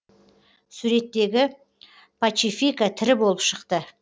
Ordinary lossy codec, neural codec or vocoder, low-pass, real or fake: none; none; none; real